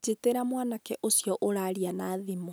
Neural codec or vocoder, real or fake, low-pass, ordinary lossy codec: none; real; none; none